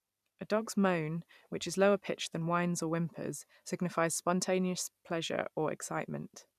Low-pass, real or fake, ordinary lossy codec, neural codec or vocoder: 14.4 kHz; fake; none; vocoder, 44.1 kHz, 128 mel bands every 256 samples, BigVGAN v2